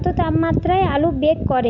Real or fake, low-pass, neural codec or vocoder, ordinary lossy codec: real; 7.2 kHz; none; none